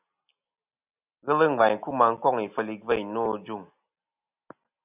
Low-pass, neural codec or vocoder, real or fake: 3.6 kHz; none; real